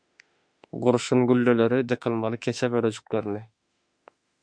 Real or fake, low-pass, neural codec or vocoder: fake; 9.9 kHz; autoencoder, 48 kHz, 32 numbers a frame, DAC-VAE, trained on Japanese speech